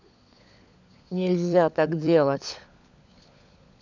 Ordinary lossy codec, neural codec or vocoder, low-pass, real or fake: none; codec, 16 kHz, 16 kbps, FunCodec, trained on LibriTTS, 50 frames a second; 7.2 kHz; fake